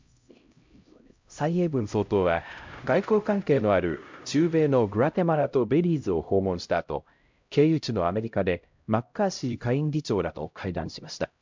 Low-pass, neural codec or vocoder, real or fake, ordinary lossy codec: 7.2 kHz; codec, 16 kHz, 0.5 kbps, X-Codec, HuBERT features, trained on LibriSpeech; fake; MP3, 64 kbps